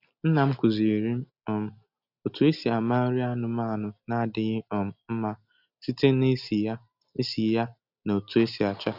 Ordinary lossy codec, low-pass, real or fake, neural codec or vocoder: none; 5.4 kHz; real; none